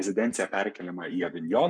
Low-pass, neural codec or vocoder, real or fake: 9.9 kHz; codec, 44.1 kHz, 7.8 kbps, Pupu-Codec; fake